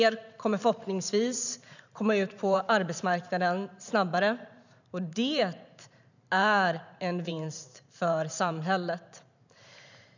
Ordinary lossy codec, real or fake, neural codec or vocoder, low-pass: none; fake; vocoder, 44.1 kHz, 128 mel bands every 512 samples, BigVGAN v2; 7.2 kHz